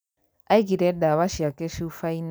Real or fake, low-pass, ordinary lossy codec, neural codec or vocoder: real; none; none; none